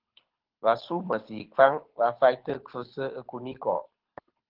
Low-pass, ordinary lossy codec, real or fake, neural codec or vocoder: 5.4 kHz; Opus, 16 kbps; fake; codec, 24 kHz, 6 kbps, HILCodec